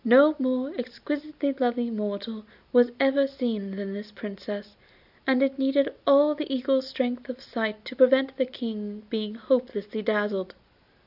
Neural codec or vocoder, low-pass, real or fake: none; 5.4 kHz; real